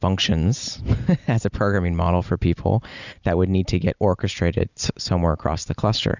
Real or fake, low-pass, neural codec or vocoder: real; 7.2 kHz; none